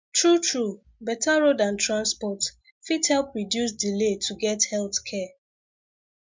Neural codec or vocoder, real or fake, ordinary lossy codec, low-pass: none; real; MP3, 64 kbps; 7.2 kHz